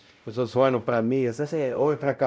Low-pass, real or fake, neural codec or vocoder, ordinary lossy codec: none; fake; codec, 16 kHz, 0.5 kbps, X-Codec, WavLM features, trained on Multilingual LibriSpeech; none